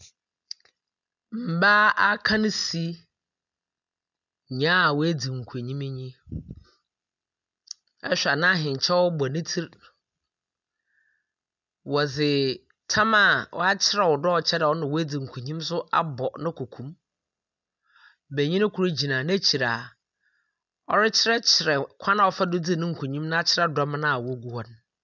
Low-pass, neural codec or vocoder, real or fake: 7.2 kHz; none; real